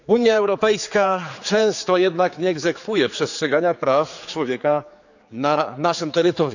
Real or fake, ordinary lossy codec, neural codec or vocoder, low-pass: fake; none; codec, 16 kHz, 4 kbps, X-Codec, HuBERT features, trained on general audio; 7.2 kHz